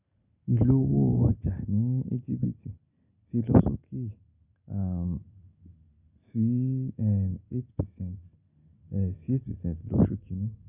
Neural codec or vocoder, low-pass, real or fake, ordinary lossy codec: none; 3.6 kHz; real; none